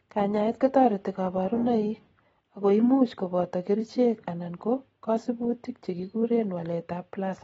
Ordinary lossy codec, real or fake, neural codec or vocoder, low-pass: AAC, 24 kbps; real; none; 19.8 kHz